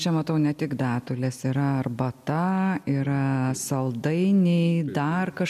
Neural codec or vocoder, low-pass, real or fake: none; 14.4 kHz; real